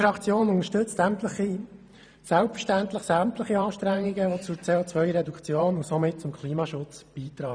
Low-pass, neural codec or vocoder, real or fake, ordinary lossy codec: 9.9 kHz; vocoder, 44.1 kHz, 128 mel bands every 512 samples, BigVGAN v2; fake; none